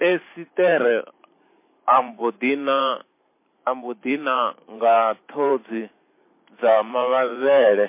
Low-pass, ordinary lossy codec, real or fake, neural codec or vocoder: 3.6 kHz; MP3, 24 kbps; fake; vocoder, 44.1 kHz, 128 mel bands every 512 samples, BigVGAN v2